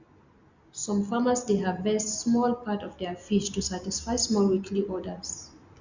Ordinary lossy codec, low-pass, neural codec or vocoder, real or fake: Opus, 64 kbps; 7.2 kHz; none; real